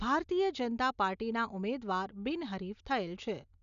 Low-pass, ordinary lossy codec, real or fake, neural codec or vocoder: 7.2 kHz; none; real; none